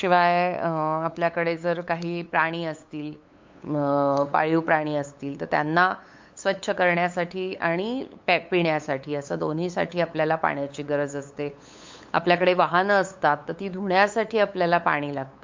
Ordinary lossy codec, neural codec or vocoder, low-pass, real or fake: MP3, 48 kbps; codec, 16 kHz, 8 kbps, FunCodec, trained on LibriTTS, 25 frames a second; 7.2 kHz; fake